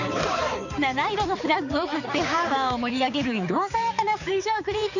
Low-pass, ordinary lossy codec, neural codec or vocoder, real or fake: 7.2 kHz; none; codec, 16 kHz, 4 kbps, X-Codec, HuBERT features, trained on balanced general audio; fake